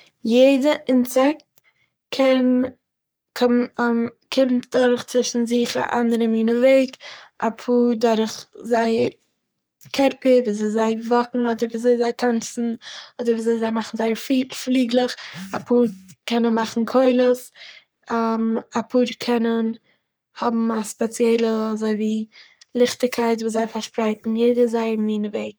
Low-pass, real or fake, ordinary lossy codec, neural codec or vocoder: none; fake; none; codec, 44.1 kHz, 3.4 kbps, Pupu-Codec